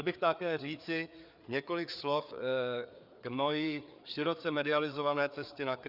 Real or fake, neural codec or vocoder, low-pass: fake; codec, 16 kHz, 4 kbps, FreqCodec, larger model; 5.4 kHz